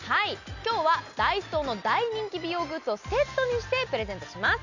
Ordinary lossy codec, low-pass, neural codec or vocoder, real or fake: none; 7.2 kHz; none; real